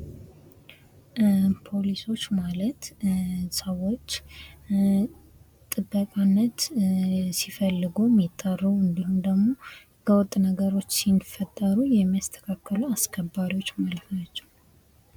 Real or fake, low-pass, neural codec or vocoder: real; 19.8 kHz; none